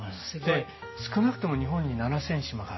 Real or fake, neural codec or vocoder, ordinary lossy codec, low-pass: real; none; MP3, 24 kbps; 7.2 kHz